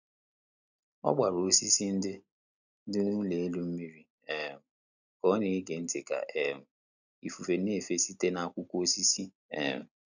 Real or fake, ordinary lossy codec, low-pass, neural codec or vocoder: real; none; 7.2 kHz; none